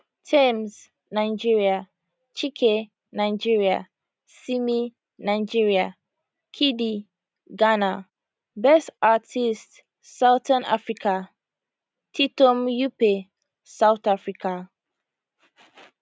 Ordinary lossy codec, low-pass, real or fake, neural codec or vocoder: none; none; real; none